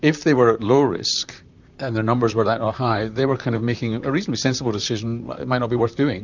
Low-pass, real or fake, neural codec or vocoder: 7.2 kHz; fake; vocoder, 44.1 kHz, 128 mel bands, Pupu-Vocoder